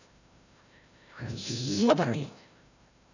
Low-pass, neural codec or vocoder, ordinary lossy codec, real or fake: 7.2 kHz; codec, 16 kHz, 0.5 kbps, FreqCodec, larger model; none; fake